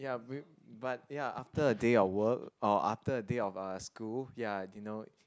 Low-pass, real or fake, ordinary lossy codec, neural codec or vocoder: none; real; none; none